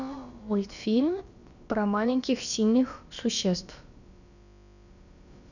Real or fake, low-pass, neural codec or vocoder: fake; 7.2 kHz; codec, 16 kHz, about 1 kbps, DyCAST, with the encoder's durations